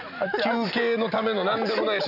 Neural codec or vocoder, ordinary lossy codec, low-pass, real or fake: none; none; 5.4 kHz; real